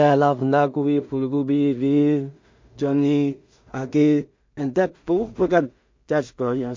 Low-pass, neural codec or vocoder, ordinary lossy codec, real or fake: 7.2 kHz; codec, 16 kHz in and 24 kHz out, 0.4 kbps, LongCat-Audio-Codec, two codebook decoder; MP3, 48 kbps; fake